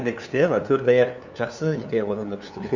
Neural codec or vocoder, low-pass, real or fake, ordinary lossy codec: codec, 16 kHz, 2 kbps, FunCodec, trained on LibriTTS, 25 frames a second; 7.2 kHz; fake; none